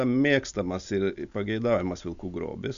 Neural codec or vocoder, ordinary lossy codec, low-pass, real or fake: none; MP3, 96 kbps; 7.2 kHz; real